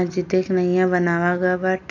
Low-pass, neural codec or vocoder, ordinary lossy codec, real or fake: 7.2 kHz; none; none; real